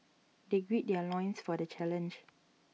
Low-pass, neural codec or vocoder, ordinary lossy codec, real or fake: none; none; none; real